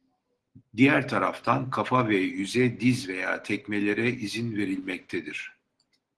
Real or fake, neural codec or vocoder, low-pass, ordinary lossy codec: real; none; 10.8 kHz; Opus, 16 kbps